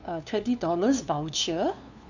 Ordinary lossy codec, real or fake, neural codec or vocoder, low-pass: none; fake; codec, 16 kHz, 2 kbps, FunCodec, trained on LibriTTS, 25 frames a second; 7.2 kHz